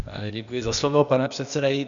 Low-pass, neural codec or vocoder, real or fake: 7.2 kHz; codec, 16 kHz, 0.8 kbps, ZipCodec; fake